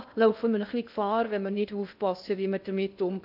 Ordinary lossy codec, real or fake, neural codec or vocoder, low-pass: AAC, 48 kbps; fake; codec, 16 kHz in and 24 kHz out, 0.6 kbps, FocalCodec, streaming, 2048 codes; 5.4 kHz